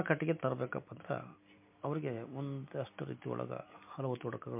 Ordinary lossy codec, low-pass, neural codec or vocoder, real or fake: MP3, 32 kbps; 3.6 kHz; none; real